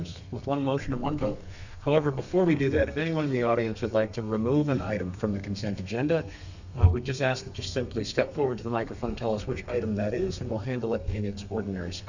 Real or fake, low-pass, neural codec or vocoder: fake; 7.2 kHz; codec, 32 kHz, 1.9 kbps, SNAC